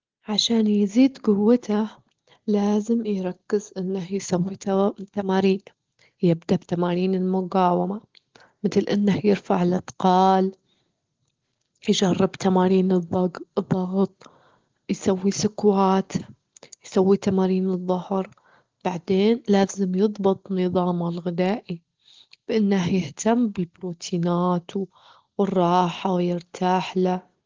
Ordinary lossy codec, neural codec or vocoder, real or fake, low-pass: Opus, 16 kbps; none; real; 7.2 kHz